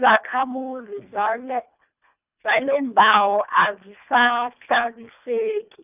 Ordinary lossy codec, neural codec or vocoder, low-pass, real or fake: none; codec, 24 kHz, 1.5 kbps, HILCodec; 3.6 kHz; fake